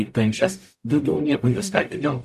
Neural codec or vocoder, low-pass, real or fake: codec, 44.1 kHz, 0.9 kbps, DAC; 14.4 kHz; fake